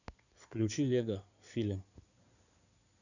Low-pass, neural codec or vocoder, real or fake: 7.2 kHz; codec, 16 kHz in and 24 kHz out, 2.2 kbps, FireRedTTS-2 codec; fake